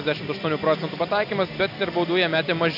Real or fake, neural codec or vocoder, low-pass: real; none; 5.4 kHz